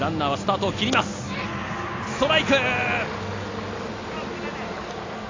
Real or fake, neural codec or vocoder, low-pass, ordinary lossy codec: real; none; 7.2 kHz; AAC, 32 kbps